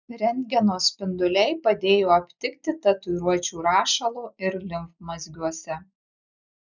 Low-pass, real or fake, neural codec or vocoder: 7.2 kHz; real; none